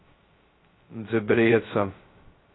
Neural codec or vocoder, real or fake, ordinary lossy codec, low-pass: codec, 16 kHz, 0.2 kbps, FocalCodec; fake; AAC, 16 kbps; 7.2 kHz